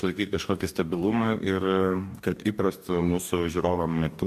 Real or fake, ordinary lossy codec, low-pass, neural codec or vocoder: fake; MP3, 96 kbps; 14.4 kHz; codec, 44.1 kHz, 2.6 kbps, DAC